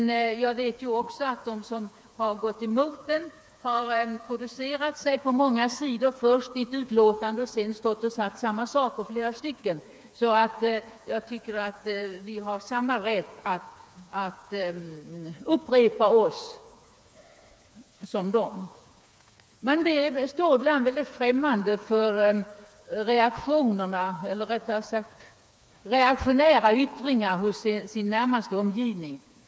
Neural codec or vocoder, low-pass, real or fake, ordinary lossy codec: codec, 16 kHz, 4 kbps, FreqCodec, smaller model; none; fake; none